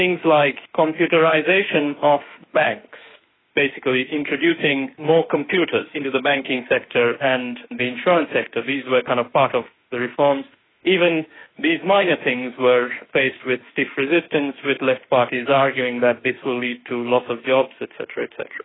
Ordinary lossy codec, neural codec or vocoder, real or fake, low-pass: AAC, 16 kbps; autoencoder, 48 kHz, 32 numbers a frame, DAC-VAE, trained on Japanese speech; fake; 7.2 kHz